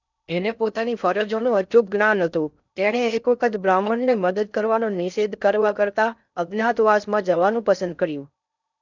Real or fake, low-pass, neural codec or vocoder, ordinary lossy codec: fake; 7.2 kHz; codec, 16 kHz in and 24 kHz out, 0.6 kbps, FocalCodec, streaming, 2048 codes; none